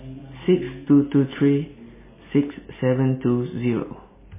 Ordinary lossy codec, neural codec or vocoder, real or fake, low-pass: MP3, 16 kbps; none; real; 3.6 kHz